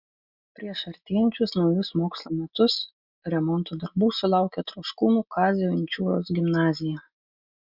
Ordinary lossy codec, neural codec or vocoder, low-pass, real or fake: Opus, 64 kbps; none; 5.4 kHz; real